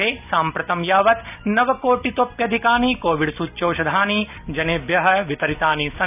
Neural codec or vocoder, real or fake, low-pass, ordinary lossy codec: none; real; 3.6 kHz; none